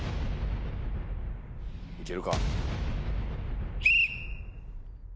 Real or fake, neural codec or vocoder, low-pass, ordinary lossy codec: real; none; none; none